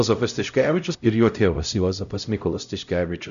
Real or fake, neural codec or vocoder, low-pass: fake; codec, 16 kHz, 0.5 kbps, X-Codec, HuBERT features, trained on LibriSpeech; 7.2 kHz